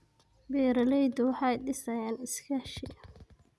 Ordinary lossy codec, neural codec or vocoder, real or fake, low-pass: none; none; real; none